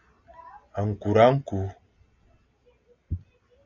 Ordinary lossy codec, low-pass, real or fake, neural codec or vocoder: AAC, 48 kbps; 7.2 kHz; real; none